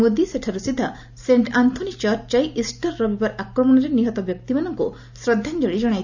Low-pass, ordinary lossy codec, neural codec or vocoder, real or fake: 7.2 kHz; none; none; real